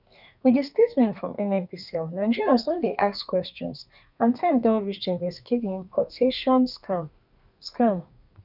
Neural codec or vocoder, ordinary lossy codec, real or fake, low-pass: codec, 32 kHz, 1.9 kbps, SNAC; none; fake; 5.4 kHz